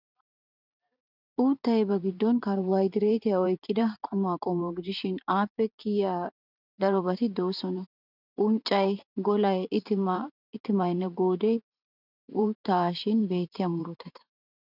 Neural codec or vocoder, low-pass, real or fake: vocoder, 44.1 kHz, 128 mel bands every 512 samples, BigVGAN v2; 5.4 kHz; fake